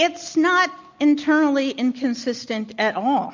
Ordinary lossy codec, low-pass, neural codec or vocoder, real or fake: MP3, 64 kbps; 7.2 kHz; none; real